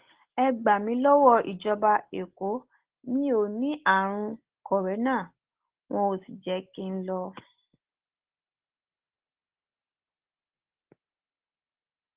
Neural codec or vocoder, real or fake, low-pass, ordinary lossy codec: none; real; 3.6 kHz; Opus, 16 kbps